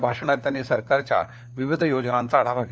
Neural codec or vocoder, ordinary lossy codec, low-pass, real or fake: codec, 16 kHz, 4 kbps, FunCodec, trained on LibriTTS, 50 frames a second; none; none; fake